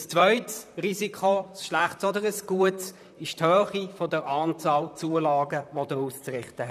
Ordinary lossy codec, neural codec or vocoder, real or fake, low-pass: none; vocoder, 44.1 kHz, 128 mel bands, Pupu-Vocoder; fake; 14.4 kHz